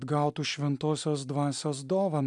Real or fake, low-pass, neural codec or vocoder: real; 10.8 kHz; none